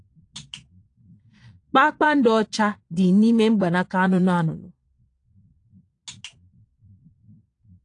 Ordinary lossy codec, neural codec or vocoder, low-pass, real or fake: AAC, 48 kbps; vocoder, 22.05 kHz, 80 mel bands, Vocos; 9.9 kHz; fake